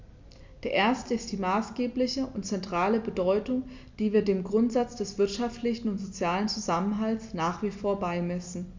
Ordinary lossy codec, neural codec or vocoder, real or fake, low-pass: MP3, 64 kbps; none; real; 7.2 kHz